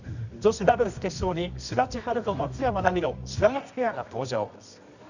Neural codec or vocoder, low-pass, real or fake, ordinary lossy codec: codec, 24 kHz, 0.9 kbps, WavTokenizer, medium music audio release; 7.2 kHz; fake; none